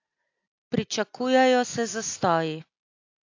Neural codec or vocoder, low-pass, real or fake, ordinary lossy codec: none; 7.2 kHz; real; AAC, 48 kbps